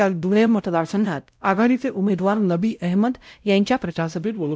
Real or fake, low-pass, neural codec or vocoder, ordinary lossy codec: fake; none; codec, 16 kHz, 0.5 kbps, X-Codec, WavLM features, trained on Multilingual LibriSpeech; none